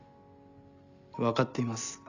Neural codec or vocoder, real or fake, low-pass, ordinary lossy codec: none; real; 7.2 kHz; none